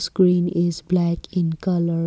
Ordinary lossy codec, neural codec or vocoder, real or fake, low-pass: none; none; real; none